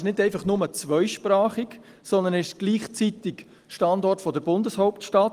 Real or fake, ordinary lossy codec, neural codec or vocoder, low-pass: real; Opus, 24 kbps; none; 14.4 kHz